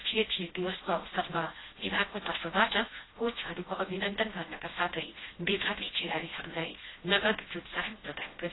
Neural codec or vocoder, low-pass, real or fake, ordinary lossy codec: codec, 16 kHz, 1 kbps, FreqCodec, smaller model; 7.2 kHz; fake; AAC, 16 kbps